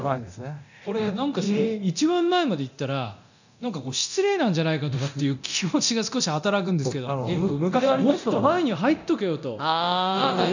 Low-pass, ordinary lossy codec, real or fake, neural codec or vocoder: 7.2 kHz; none; fake; codec, 24 kHz, 0.9 kbps, DualCodec